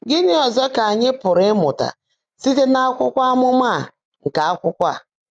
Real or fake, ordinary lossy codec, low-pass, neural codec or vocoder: real; none; 9.9 kHz; none